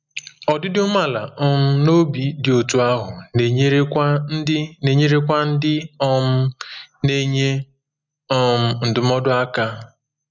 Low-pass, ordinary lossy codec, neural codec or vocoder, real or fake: 7.2 kHz; none; none; real